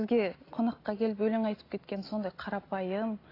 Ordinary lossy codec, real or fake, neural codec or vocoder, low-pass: AAC, 24 kbps; real; none; 5.4 kHz